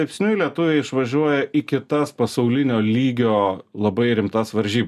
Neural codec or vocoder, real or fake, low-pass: none; real; 14.4 kHz